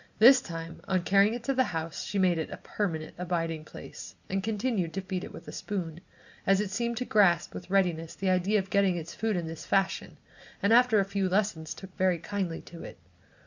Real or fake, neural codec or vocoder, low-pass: real; none; 7.2 kHz